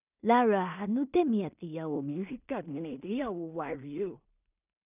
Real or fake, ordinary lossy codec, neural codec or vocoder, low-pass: fake; none; codec, 16 kHz in and 24 kHz out, 0.4 kbps, LongCat-Audio-Codec, two codebook decoder; 3.6 kHz